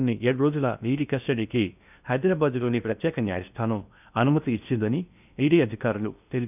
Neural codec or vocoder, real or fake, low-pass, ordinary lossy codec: codec, 16 kHz in and 24 kHz out, 0.6 kbps, FocalCodec, streaming, 2048 codes; fake; 3.6 kHz; none